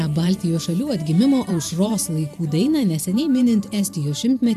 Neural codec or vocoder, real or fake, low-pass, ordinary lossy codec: vocoder, 44.1 kHz, 128 mel bands every 512 samples, BigVGAN v2; fake; 14.4 kHz; AAC, 96 kbps